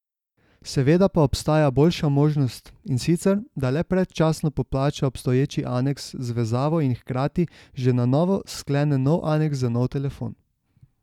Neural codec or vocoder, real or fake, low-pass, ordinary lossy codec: none; real; 19.8 kHz; none